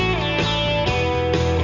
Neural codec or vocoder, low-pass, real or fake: none; 7.2 kHz; real